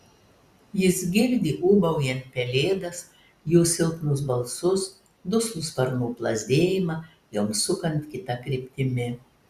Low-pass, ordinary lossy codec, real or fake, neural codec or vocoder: 14.4 kHz; Opus, 64 kbps; real; none